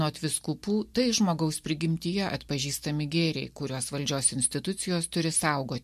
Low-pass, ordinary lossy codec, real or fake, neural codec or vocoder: 14.4 kHz; MP3, 64 kbps; real; none